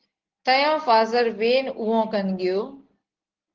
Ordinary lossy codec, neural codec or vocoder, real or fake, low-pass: Opus, 16 kbps; none; real; 7.2 kHz